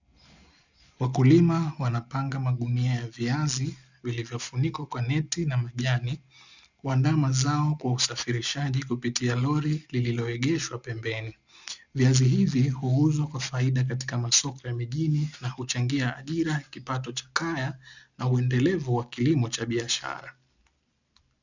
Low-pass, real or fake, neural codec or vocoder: 7.2 kHz; real; none